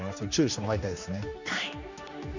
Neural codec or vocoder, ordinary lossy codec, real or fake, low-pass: codec, 16 kHz, 2 kbps, X-Codec, HuBERT features, trained on general audio; none; fake; 7.2 kHz